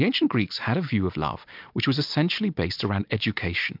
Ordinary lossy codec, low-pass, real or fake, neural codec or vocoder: MP3, 48 kbps; 5.4 kHz; real; none